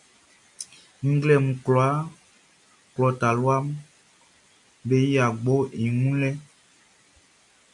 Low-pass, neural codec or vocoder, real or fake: 10.8 kHz; none; real